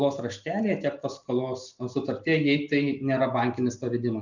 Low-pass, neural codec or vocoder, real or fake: 7.2 kHz; none; real